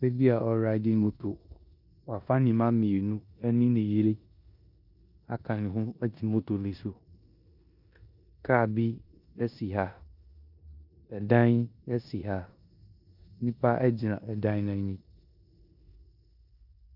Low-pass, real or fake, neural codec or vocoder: 5.4 kHz; fake; codec, 16 kHz in and 24 kHz out, 0.9 kbps, LongCat-Audio-Codec, four codebook decoder